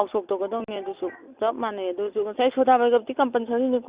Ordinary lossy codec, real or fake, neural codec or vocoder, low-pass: Opus, 64 kbps; real; none; 3.6 kHz